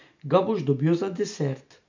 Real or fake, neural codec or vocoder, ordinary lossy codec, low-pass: real; none; MP3, 64 kbps; 7.2 kHz